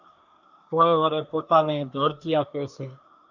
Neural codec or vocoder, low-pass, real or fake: codec, 24 kHz, 1 kbps, SNAC; 7.2 kHz; fake